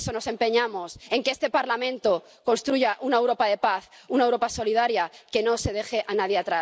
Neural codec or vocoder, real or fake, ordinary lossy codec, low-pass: none; real; none; none